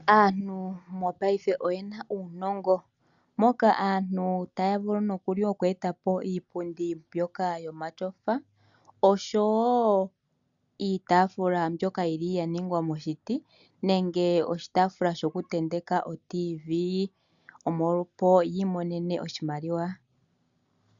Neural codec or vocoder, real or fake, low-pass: none; real; 7.2 kHz